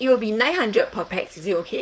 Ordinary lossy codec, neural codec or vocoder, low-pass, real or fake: none; codec, 16 kHz, 4.8 kbps, FACodec; none; fake